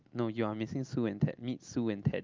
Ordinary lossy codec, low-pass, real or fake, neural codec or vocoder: Opus, 24 kbps; 7.2 kHz; real; none